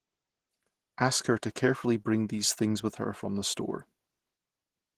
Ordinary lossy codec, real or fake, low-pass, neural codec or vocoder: Opus, 16 kbps; fake; 14.4 kHz; vocoder, 48 kHz, 128 mel bands, Vocos